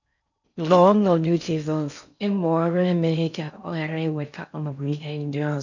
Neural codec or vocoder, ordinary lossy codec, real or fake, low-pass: codec, 16 kHz in and 24 kHz out, 0.6 kbps, FocalCodec, streaming, 4096 codes; none; fake; 7.2 kHz